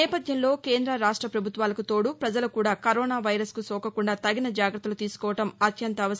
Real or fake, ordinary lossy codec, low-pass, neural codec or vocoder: real; none; none; none